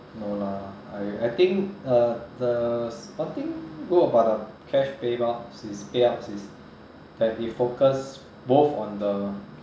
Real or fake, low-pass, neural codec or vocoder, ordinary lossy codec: real; none; none; none